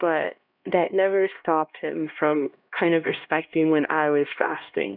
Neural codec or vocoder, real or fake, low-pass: codec, 16 kHz, 1 kbps, X-Codec, HuBERT features, trained on LibriSpeech; fake; 5.4 kHz